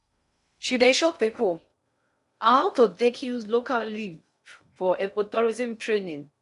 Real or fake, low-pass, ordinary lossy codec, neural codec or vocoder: fake; 10.8 kHz; none; codec, 16 kHz in and 24 kHz out, 0.6 kbps, FocalCodec, streaming, 2048 codes